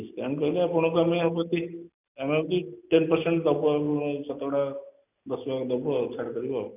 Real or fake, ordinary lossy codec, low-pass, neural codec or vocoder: real; none; 3.6 kHz; none